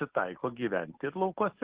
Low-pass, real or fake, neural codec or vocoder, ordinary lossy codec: 3.6 kHz; real; none; Opus, 16 kbps